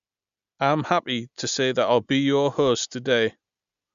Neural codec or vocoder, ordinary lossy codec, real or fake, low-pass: none; Opus, 64 kbps; real; 7.2 kHz